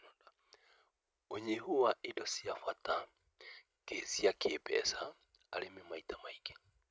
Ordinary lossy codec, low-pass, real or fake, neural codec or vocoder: none; none; real; none